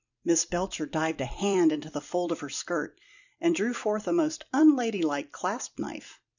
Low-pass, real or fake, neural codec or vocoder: 7.2 kHz; fake; vocoder, 44.1 kHz, 128 mel bands every 256 samples, BigVGAN v2